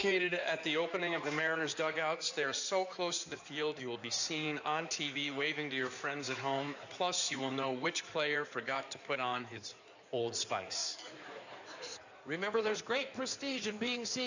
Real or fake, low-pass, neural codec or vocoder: fake; 7.2 kHz; codec, 16 kHz in and 24 kHz out, 2.2 kbps, FireRedTTS-2 codec